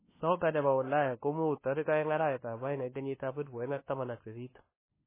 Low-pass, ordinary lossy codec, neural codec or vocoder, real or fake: 3.6 kHz; MP3, 16 kbps; codec, 16 kHz, 2 kbps, FunCodec, trained on LibriTTS, 25 frames a second; fake